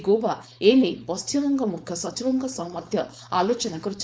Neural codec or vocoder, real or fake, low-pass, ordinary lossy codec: codec, 16 kHz, 4.8 kbps, FACodec; fake; none; none